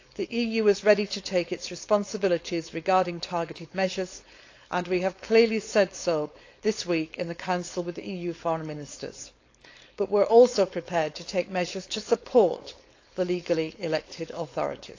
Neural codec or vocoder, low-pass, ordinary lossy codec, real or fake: codec, 16 kHz, 4.8 kbps, FACodec; 7.2 kHz; AAC, 48 kbps; fake